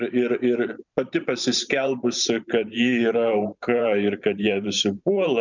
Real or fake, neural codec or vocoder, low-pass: fake; vocoder, 44.1 kHz, 128 mel bands every 512 samples, BigVGAN v2; 7.2 kHz